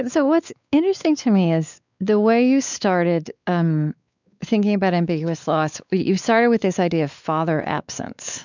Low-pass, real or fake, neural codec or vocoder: 7.2 kHz; fake; codec, 16 kHz, 4 kbps, X-Codec, WavLM features, trained on Multilingual LibriSpeech